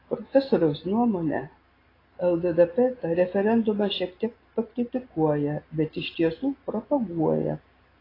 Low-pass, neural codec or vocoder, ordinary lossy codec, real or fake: 5.4 kHz; none; AAC, 24 kbps; real